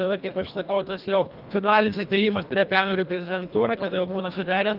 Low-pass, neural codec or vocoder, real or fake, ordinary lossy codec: 5.4 kHz; codec, 24 kHz, 1.5 kbps, HILCodec; fake; Opus, 24 kbps